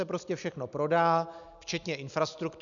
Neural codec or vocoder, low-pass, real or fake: none; 7.2 kHz; real